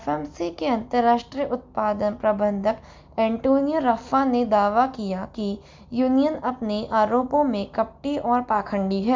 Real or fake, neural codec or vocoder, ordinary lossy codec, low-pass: real; none; MP3, 64 kbps; 7.2 kHz